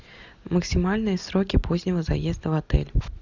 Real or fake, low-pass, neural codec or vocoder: real; 7.2 kHz; none